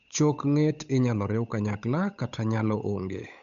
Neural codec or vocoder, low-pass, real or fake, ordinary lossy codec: codec, 16 kHz, 8 kbps, FunCodec, trained on Chinese and English, 25 frames a second; 7.2 kHz; fake; none